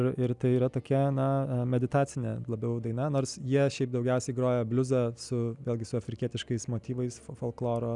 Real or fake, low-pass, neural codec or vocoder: real; 10.8 kHz; none